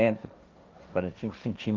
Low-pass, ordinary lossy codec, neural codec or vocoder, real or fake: 7.2 kHz; Opus, 24 kbps; codec, 16 kHz, 1.1 kbps, Voila-Tokenizer; fake